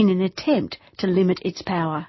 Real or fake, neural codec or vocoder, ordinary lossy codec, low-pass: real; none; MP3, 24 kbps; 7.2 kHz